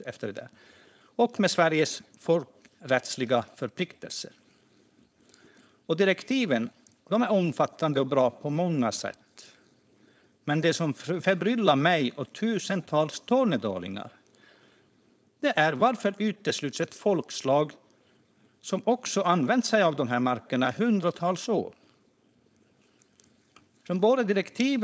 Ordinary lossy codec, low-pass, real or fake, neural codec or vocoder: none; none; fake; codec, 16 kHz, 4.8 kbps, FACodec